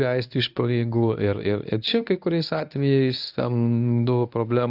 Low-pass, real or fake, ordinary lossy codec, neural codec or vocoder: 5.4 kHz; fake; AAC, 48 kbps; codec, 24 kHz, 0.9 kbps, WavTokenizer, medium speech release version 1